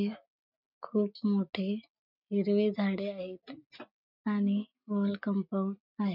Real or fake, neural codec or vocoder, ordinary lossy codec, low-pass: real; none; none; 5.4 kHz